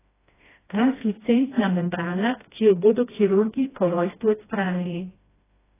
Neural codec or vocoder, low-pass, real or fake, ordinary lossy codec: codec, 16 kHz, 1 kbps, FreqCodec, smaller model; 3.6 kHz; fake; AAC, 16 kbps